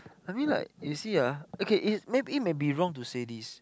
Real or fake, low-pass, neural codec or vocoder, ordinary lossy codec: real; none; none; none